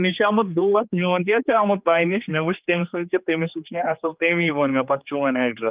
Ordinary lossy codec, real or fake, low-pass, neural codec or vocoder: Opus, 32 kbps; fake; 3.6 kHz; codec, 16 kHz, 4 kbps, X-Codec, HuBERT features, trained on general audio